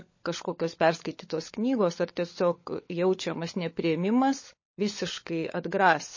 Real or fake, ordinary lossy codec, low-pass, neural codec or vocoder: fake; MP3, 32 kbps; 7.2 kHz; codec, 16 kHz, 16 kbps, FunCodec, trained on LibriTTS, 50 frames a second